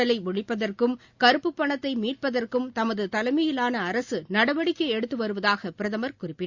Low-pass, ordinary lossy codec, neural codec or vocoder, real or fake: 7.2 kHz; Opus, 64 kbps; none; real